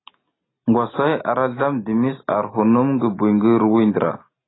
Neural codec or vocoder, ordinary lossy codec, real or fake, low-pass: none; AAC, 16 kbps; real; 7.2 kHz